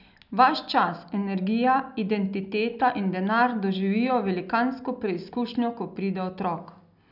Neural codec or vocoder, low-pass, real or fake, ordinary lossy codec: none; 5.4 kHz; real; none